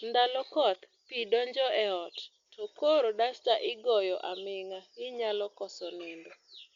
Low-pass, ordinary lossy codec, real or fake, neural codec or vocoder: 7.2 kHz; Opus, 64 kbps; real; none